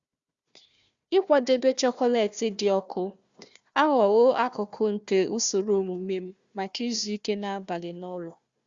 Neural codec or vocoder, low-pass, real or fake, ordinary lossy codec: codec, 16 kHz, 1 kbps, FunCodec, trained on Chinese and English, 50 frames a second; 7.2 kHz; fake; Opus, 64 kbps